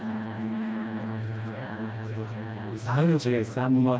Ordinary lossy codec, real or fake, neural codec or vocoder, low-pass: none; fake; codec, 16 kHz, 1 kbps, FreqCodec, smaller model; none